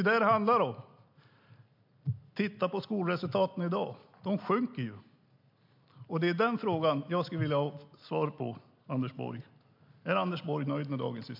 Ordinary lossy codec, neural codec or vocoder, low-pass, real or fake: MP3, 48 kbps; none; 5.4 kHz; real